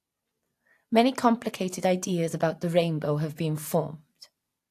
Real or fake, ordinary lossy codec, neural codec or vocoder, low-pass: real; AAC, 64 kbps; none; 14.4 kHz